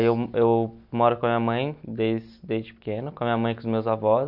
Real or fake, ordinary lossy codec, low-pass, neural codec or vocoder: real; none; 5.4 kHz; none